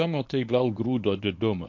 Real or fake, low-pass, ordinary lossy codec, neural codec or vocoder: fake; 7.2 kHz; MP3, 48 kbps; codec, 24 kHz, 0.9 kbps, WavTokenizer, medium speech release version 1